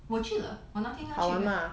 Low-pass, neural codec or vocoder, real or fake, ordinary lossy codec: none; none; real; none